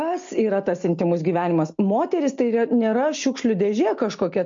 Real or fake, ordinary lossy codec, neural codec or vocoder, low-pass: real; MP3, 48 kbps; none; 7.2 kHz